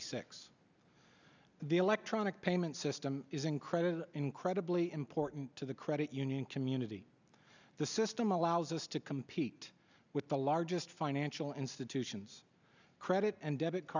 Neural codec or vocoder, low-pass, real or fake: none; 7.2 kHz; real